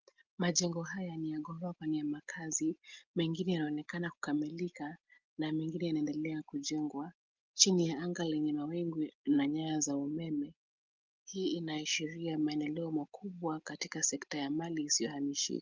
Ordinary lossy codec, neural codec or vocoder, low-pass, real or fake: Opus, 24 kbps; none; 7.2 kHz; real